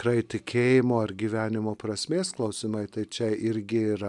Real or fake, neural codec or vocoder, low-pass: real; none; 10.8 kHz